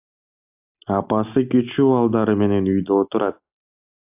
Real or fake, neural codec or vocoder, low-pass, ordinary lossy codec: real; none; 3.6 kHz; AAC, 32 kbps